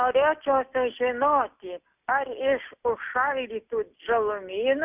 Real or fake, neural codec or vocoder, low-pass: real; none; 3.6 kHz